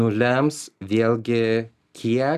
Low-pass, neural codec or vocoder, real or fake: 14.4 kHz; none; real